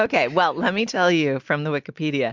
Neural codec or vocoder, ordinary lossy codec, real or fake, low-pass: none; MP3, 64 kbps; real; 7.2 kHz